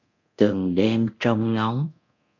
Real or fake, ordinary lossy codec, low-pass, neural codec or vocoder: fake; MP3, 64 kbps; 7.2 kHz; codec, 24 kHz, 0.9 kbps, DualCodec